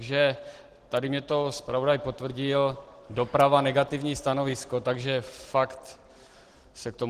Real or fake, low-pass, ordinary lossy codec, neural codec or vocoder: real; 14.4 kHz; Opus, 16 kbps; none